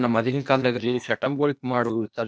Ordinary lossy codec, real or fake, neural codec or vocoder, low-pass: none; fake; codec, 16 kHz, 0.8 kbps, ZipCodec; none